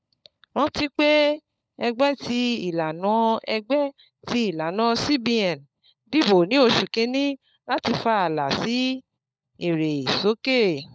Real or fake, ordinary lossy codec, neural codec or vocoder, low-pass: fake; none; codec, 16 kHz, 16 kbps, FunCodec, trained on LibriTTS, 50 frames a second; none